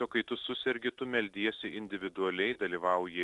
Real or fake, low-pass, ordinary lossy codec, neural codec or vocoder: real; 10.8 kHz; Opus, 64 kbps; none